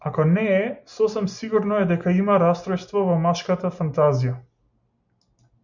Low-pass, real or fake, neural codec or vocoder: 7.2 kHz; real; none